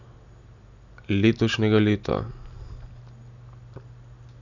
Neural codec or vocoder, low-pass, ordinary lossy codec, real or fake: none; 7.2 kHz; none; real